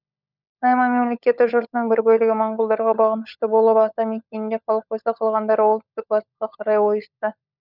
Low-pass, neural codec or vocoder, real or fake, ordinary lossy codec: 5.4 kHz; codec, 16 kHz, 16 kbps, FunCodec, trained on LibriTTS, 50 frames a second; fake; none